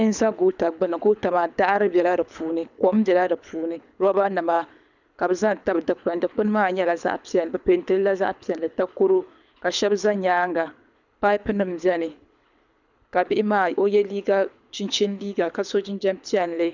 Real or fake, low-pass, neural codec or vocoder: fake; 7.2 kHz; codec, 24 kHz, 6 kbps, HILCodec